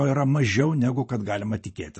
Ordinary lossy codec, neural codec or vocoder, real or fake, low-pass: MP3, 32 kbps; vocoder, 44.1 kHz, 128 mel bands every 512 samples, BigVGAN v2; fake; 10.8 kHz